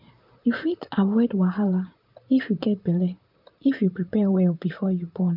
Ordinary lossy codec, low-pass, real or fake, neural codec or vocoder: none; 5.4 kHz; fake; vocoder, 44.1 kHz, 128 mel bands, Pupu-Vocoder